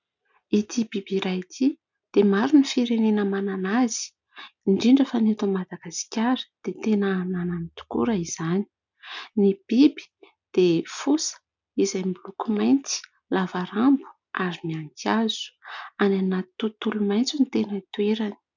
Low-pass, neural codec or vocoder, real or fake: 7.2 kHz; none; real